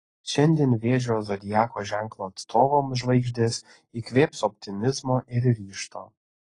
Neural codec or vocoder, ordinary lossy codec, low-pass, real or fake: codec, 44.1 kHz, 7.8 kbps, Pupu-Codec; AAC, 32 kbps; 10.8 kHz; fake